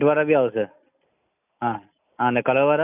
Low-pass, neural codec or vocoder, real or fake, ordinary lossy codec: 3.6 kHz; none; real; none